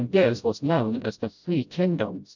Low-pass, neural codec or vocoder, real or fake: 7.2 kHz; codec, 16 kHz, 0.5 kbps, FreqCodec, smaller model; fake